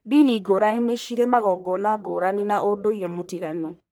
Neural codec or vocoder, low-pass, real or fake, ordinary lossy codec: codec, 44.1 kHz, 1.7 kbps, Pupu-Codec; none; fake; none